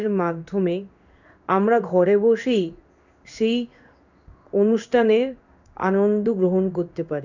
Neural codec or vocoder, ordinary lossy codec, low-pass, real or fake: codec, 16 kHz in and 24 kHz out, 1 kbps, XY-Tokenizer; none; 7.2 kHz; fake